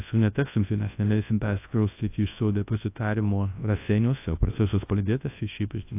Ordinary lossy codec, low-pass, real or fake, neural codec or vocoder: AAC, 24 kbps; 3.6 kHz; fake; codec, 24 kHz, 0.9 kbps, WavTokenizer, large speech release